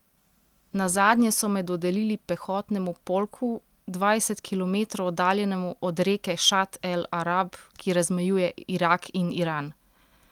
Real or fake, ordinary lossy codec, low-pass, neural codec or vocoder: real; Opus, 32 kbps; 19.8 kHz; none